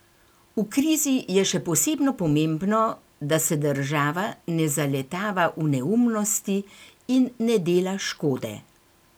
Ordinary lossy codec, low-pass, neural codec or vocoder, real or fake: none; none; none; real